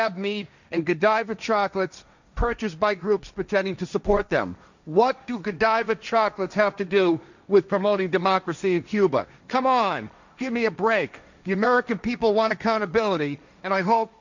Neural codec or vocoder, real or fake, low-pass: codec, 16 kHz, 1.1 kbps, Voila-Tokenizer; fake; 7.2 kHz